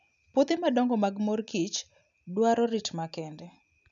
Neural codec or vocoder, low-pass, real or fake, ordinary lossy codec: none; 7.2 kHz; real; none